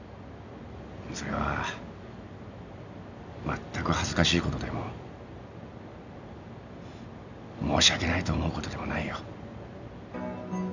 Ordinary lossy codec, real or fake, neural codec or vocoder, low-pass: none; real; none; 7.2 kHz